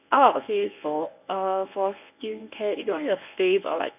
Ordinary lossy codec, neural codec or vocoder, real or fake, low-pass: none; codec, 24 kHz, 0.9 kbps, WavTokenizer, medium speech release version 1; fake; 3.6 kHz